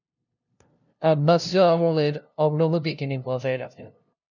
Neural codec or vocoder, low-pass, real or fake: codec, 16 kHz, 0.5 kbps, FunCodec, trained on LibriTTS, 25 frames a second; 7.2 kHz; fake